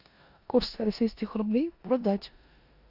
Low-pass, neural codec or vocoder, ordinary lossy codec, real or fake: 5.4 kHz; codec, 16 kHz in and 24 kHz out, 0.9 kbps, LongCat-Audio-Codec, four codebook decoder; AAC, 48 kbps; fake